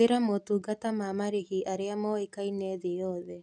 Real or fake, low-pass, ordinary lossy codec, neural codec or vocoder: real; 9.9 kHz; none; none